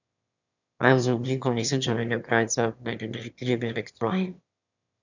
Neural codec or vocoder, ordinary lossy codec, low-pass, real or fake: autoencoder, 22.05 kHz, a latent of 192 numbers a frame, VITS, trained on one speaker; none; 7.2 kHz; fake